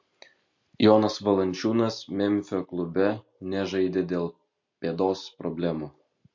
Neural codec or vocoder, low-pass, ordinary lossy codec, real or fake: none; 7.2 kHz; MP3, 48 kbps; real